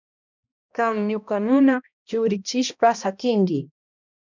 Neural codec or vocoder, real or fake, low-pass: codec, 16 kHz, 1 kbps, X-Codec, HuBERT features, trained on balanced general audio; fake; 7.2 kHz